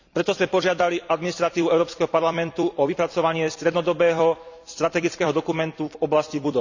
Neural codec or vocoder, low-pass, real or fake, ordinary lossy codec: vocoder, 44.1 kHz, 128 mel bands every 256 samples, BigVGAN v2; 7.2 kHz; fake; none